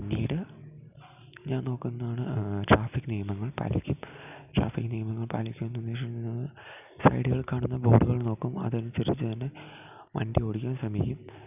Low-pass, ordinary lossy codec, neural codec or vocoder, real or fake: 3.6 kHz; none; none; real